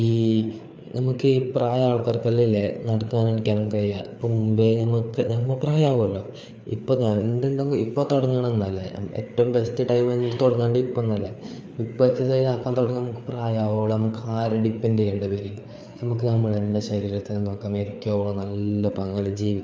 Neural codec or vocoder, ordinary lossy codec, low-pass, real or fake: codec, 16 kHz, 4 kbps, FreqCodec, larger model; none; none; fake